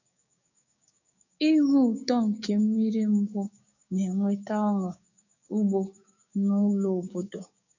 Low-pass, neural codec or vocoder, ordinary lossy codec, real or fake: 7.2 kHz; codec, 16 kHz, 6 kbps, DAC; none; fake